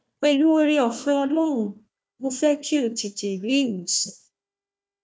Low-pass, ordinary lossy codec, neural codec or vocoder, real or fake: none; none; codec, 16 kHz, 1 kbps, FunCodec, trained on Chinese and English, 50 frames a second; fake